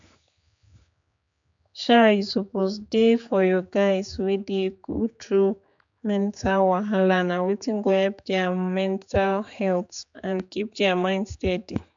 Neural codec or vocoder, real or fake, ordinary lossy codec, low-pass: codec, 16 kHz, 4 kbps, X-Codec, HuBERT features, trained on general audio; fake; MP3, 64 kbps; 7.2 kHz